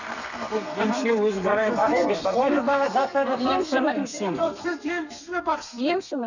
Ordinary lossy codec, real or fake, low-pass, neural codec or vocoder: none; fake; 7.2 kHz; codec, 32 kHz, 1.9 kbps, SNAC